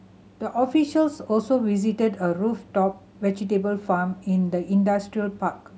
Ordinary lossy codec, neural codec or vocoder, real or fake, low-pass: none; none; real; none